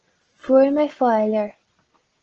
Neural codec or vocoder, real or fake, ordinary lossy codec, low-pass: none; real; Opus, 16 kbps; 7.2 kHz